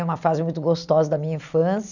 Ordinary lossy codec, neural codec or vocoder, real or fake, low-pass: none; none; real; 7.2 kHz